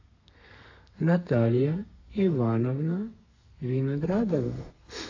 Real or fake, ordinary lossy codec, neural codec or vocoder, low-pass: fake; AAC, 48 kbps; codec, 32 kHz, 1.9 kbps, SNAC; 7.2 kHz